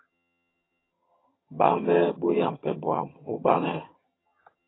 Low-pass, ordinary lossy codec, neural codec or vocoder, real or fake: 7.2 kHz; AAC, 16 kbps; vocoder, 22.05 kHz, 80 mel bands, HiFi-GAN; fake